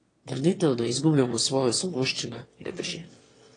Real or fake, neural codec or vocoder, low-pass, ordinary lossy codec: fake; autoencoder, 22.05 kHz, a latent of 192 numbers a frame, VITS, trained on one speaker; 9.9 kHz; AAC, 32 kbps